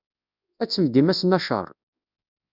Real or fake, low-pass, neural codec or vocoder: fake; 5.4 kHz; codec, 24 kHz, 0.9 kbps, WavTokenizer, large speech release